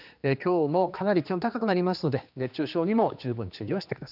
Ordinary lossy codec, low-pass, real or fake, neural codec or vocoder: none; 5.4 kHz; fake; codec, 16 kHz, 2 kbps, X-Codec, HuBERT features, trained on general audio